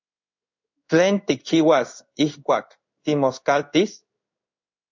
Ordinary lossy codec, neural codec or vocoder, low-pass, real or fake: MP3, 64 kbps; codec, 16 kHz in and 24 kHz out, 1 kbps, XY-Tokenizer; 7.2 kHz; fake